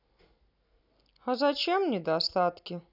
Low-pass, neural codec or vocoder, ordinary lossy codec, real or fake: 5.4 kHz; none; none; real